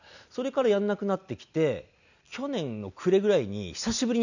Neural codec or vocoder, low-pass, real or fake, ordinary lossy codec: none; 7.2 kHz; real; none